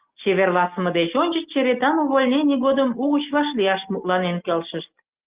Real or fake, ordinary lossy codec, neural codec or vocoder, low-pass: real; Opus, 24 kbps; none; 3.6 kHz